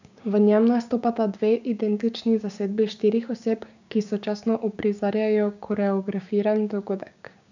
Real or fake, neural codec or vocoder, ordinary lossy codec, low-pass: fake; codec, 16 kHz, 6 kbps, DAC; none; 7.2 kHz